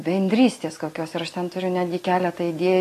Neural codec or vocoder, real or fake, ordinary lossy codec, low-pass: none; real; AAC, 48 kbps; 14.4 kHz